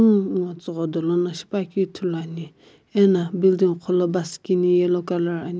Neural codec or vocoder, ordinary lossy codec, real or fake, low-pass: none; none; real; none